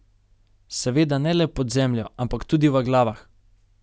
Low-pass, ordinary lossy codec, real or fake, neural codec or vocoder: none; none; real; none